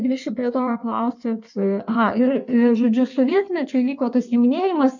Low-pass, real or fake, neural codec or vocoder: 7.2 kHz; fake; codec, 16 kHz in and 24 kHz out, 1.1 kbps, FireRedTTS-2 codec